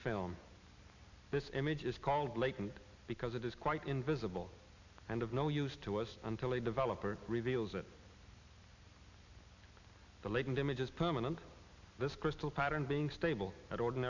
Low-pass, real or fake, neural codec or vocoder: 7.2 kHz; real; none